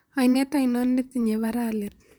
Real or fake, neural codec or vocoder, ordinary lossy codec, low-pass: fake; vocoder, 44.1 kHz, 128 mel bands, Pupu-Vocoder; none; none